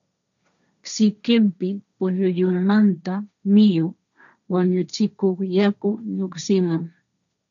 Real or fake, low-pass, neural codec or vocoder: fake; 7.2 kHz; codec, 16 kHz, 1.1 kbps, Voila-Tokenizer